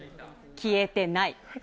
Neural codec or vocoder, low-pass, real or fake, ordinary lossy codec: none; none; real; none